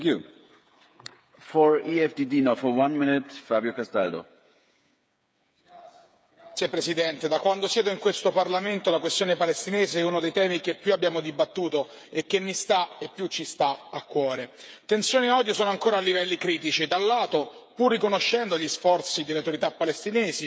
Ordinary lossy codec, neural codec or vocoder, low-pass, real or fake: none; codec, 16 kHz, 8 kbps, FreqCodec, smaller model; none; fake